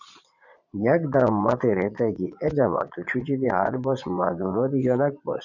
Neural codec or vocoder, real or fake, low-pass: vocoder, 22.05 kHz, 80 mel bands, Vocos; fake; 7.2 kHz